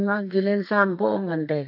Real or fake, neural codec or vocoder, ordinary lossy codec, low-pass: fake; codec, 32 kHz, 1.9 kbps, SNAC; none; 5.4 kHz